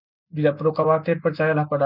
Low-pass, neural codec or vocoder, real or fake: 5.4 kHz; none; real